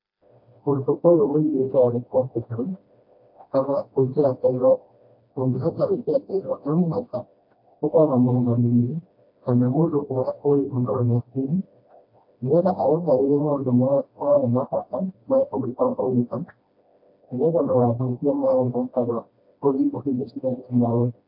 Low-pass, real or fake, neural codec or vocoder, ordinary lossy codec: 5.4 kHz; fake; codec, 16 kHz, 1 kbps, FreqCodec, smaller model; MP3, 32 kbps